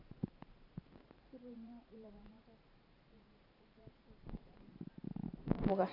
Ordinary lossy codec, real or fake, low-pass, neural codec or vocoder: none; fake; 5.4 kHz; vocoder, 44.1 kHz, 128 mel bands every 512 samples, BigVGAN v2